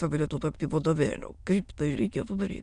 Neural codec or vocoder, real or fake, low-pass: autoencoder, 22.05 kHz, a latent of 192 numbers a frame, VITS, trained on many speakers; fake; 9.9 kHz